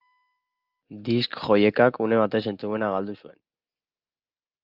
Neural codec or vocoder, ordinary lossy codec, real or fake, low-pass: none; Opus, 32 kbps; real; 5.4 kHz